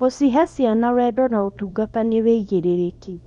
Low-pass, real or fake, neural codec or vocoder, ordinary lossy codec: 10.8 kHz; fake; codec, 24 kHz, 0.9 kbps, WavTokenizer, small release; none